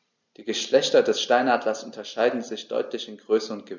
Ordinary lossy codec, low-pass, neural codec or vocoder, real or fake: none; 7.2 kHz; none; real